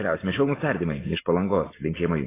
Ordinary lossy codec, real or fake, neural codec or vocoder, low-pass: AAC, 16 kbps; real; none; 3.6 kHz